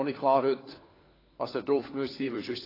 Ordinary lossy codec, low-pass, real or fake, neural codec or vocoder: AAC, 24 kbps; 5.4 kHz; fake; codec, 16 kHz, 4 kbps, FunCodec, trained on LibriTTS, 50 frames a second